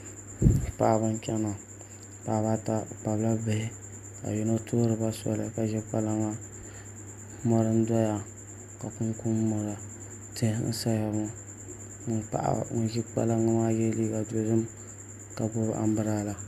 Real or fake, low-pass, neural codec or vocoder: real; 14.4 kHz; none